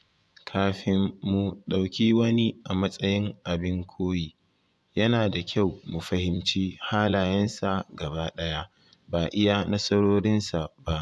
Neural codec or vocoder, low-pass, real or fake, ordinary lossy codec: vocoder, 24 kHz, 100 mel bands, Vocos; none; fake; none